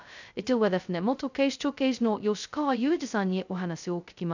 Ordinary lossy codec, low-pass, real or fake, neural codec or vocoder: none; 7.2 kHz; fake; codec, 16 kHz, 0.2 kbps, FocalCodec